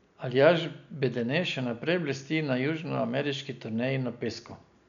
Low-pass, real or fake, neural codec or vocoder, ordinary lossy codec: 7.2 kHz; real; none; none